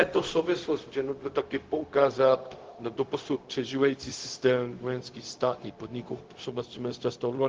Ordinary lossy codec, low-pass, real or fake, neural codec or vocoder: Opus, 16 kbps; 7.2 kHz; fake; codec, 16 kHz, 0.4 kbps, LongCat-Audio-Codec